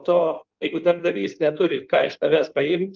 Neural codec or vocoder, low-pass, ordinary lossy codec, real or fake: codec, 16 kHz in and 24 kHz out, 1.1 kbps, FireRedTTS-2 codec; 7.2 kHz; Opus, 32 kbps; fake